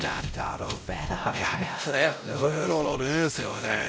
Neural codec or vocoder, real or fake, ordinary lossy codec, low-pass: codec, 16 kHz, 0.5 kbps, X-Codec, WavLM features, trained on Multilingual LibriSpeech; fake; none; none